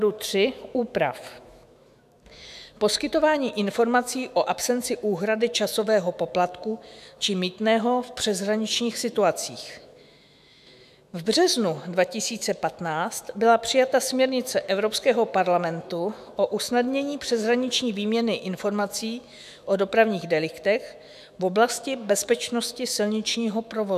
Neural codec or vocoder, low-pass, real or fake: autoencoder, 48 kHz, 128 numbers a frame, DAC-VAE, trained on Japanese speech; 14.4 kHz; fake